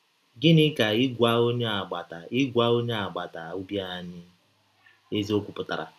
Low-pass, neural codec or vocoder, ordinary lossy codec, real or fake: 14.4 kHz; none; none; real